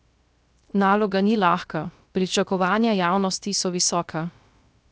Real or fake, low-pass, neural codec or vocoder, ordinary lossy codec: fake; none; codec, 16 kHz, 0.7 kbps, FocalCodec; none